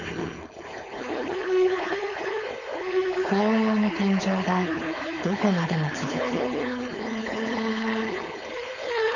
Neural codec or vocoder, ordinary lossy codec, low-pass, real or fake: codec, 16 kHz, 4.8 kbps, FACodec; none; 7.2 kHz; fake